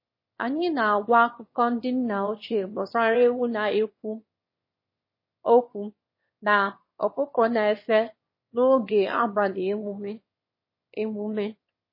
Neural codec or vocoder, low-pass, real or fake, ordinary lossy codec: autoencoder, 22.05 kHz, a latent of 192 numbers a frame, VITS, trained on one speaker; 5.4 kHz; fake; MP3, 24 kbps